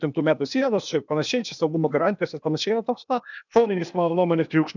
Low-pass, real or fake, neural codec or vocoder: 7.2 kHz; fake; codec, 16 kHz, 0.8 kbps, ZipCodec